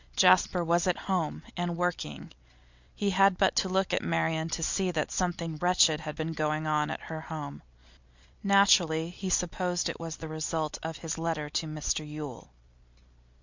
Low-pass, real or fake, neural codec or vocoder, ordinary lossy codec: 7.2 kHz; real; none; Opus, 64 kbps